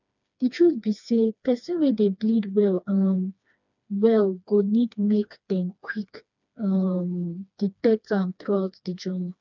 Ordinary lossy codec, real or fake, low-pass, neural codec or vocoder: none; fake; 7.2 kHz; codec, 16 kHz, 2 kbps, FreqCodec, smaller model